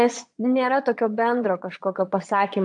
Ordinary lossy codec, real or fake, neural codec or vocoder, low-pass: AAC, 64 kbps; fake; vocoder, 22.05 kHz, 80 mel bands, Vocos; 9.9 kHz